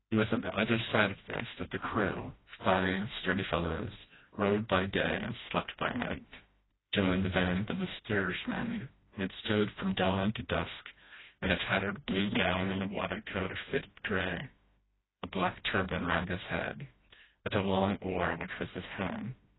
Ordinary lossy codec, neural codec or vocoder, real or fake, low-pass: AAC, 16 kbps; codec, 16 kHz, 1 kbps, FreqCodec, smaller model; fake; 7.2 kHz